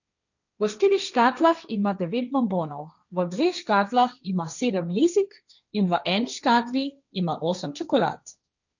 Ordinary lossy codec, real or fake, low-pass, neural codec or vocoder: none; fake; 7.2 kHz; codec, 16 kHz, 1.1 kbps, Voila-Tokenizer